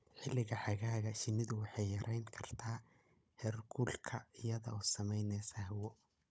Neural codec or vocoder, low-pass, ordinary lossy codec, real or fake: codec, 16 kHz, 16 kbps, FunCodec, trained on Chinese and English, 50 frames a second; none; none; fake